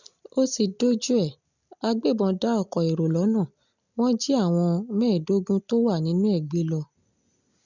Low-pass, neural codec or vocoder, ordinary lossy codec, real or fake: 7.2 kHz; none; none; real